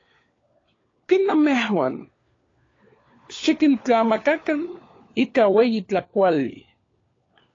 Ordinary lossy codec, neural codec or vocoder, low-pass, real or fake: AAC, 32 kbps; codec, 16 kHz, 4 kbps, FunCodec, trained on LibriTTS, 50 frames a second; 7.2 kHz; fake